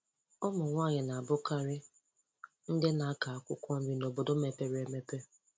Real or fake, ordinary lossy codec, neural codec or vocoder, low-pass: real; none; none; none